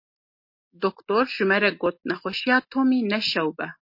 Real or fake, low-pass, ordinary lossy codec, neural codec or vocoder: real; 5.4 kHz; MP3, 32 kbps; none